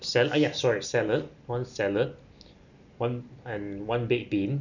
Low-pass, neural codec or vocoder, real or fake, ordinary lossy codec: 7.2 kHz; none; real; none